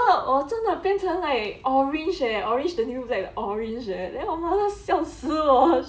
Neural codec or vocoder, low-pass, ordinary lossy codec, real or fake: none; none; none; real